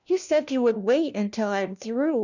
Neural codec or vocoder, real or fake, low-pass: codec, 16 kHz, 1 kbps, FunCodec, trained on LibriTTS, 50 frames a second; fake; 7.2 kHz